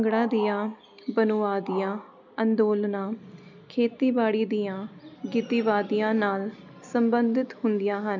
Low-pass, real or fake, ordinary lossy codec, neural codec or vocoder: 7.2 kHz; real; none; none